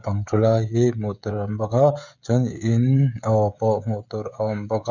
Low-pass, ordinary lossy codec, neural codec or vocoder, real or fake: 7.2 kHz; none; codec, 16 kHz, 16 kbps, FreqCodec, smaller model; fake